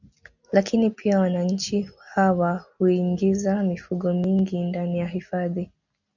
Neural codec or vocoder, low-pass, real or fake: none; 7.2 kHz; real